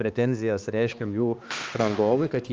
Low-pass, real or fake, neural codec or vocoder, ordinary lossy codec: 7.2 kHz; fake; codec, 16 kHz, 2 kbps, X-Codec, HuBERT features, trained on balanced general audio; Opus, 24 kbps